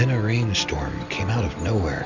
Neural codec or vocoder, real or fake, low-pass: none; real; 7.2 kHz